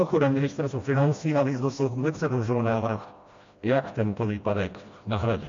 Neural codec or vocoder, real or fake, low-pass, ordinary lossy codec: codec, 16 kHz, 1 kbps, FreqCodec, smaller model; fake; 7.2 kHz; MP3, 48 kbps